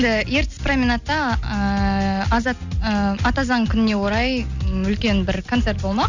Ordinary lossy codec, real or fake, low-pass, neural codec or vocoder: none; real; 7.2 kHz; none